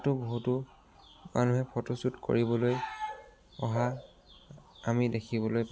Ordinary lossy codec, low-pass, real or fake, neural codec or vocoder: none; none; real; none